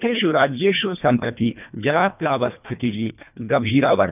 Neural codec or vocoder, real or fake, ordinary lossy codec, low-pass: codec, 24 kHz, 1.5 kbps, HILCodec; fake; none; 3.6 kHz